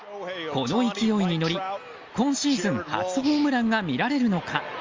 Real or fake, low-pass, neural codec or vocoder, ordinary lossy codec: real; 7.2 kHz; none; Opus, 32 kbps